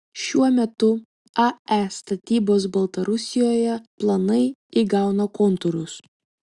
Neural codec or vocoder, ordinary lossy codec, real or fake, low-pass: none; Opus, 64 kbps; real; 10.8 kHz